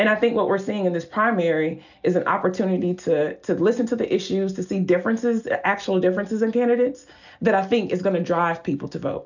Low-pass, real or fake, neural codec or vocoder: 7.2 kHz; real; none